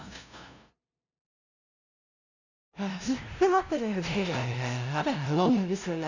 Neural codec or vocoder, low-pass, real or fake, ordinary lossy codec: codec, 16 kHz, 0.5 kbps, FunCodec, trained on LibriTTS, 25 frames a second; 7.2 kHz; fake; none